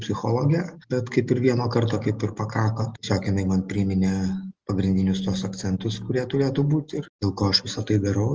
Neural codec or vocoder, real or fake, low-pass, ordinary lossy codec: none; real; 7.2 kHz; Opus, 24 kbps